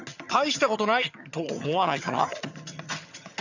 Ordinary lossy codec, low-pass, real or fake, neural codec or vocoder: none; 7.2 kHz; fake; vocoder, 22.05 kHz, 80 mel bands, HiFi-GAN